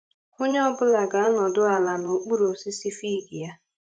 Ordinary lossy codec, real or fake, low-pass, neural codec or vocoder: none; fake; 9.9 kHz; vocoder, 44.1 kHz, 128 mel bands every 512 samples, BigVGAN v2